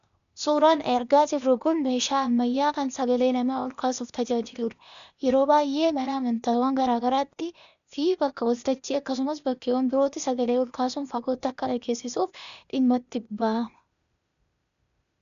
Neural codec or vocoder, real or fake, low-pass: codec, 16 kHz, 0.8 kbps, ZipCodec; fake; 7.2 kHz